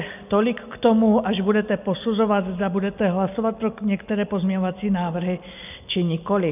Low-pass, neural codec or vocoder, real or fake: 3.6 kHz; none; real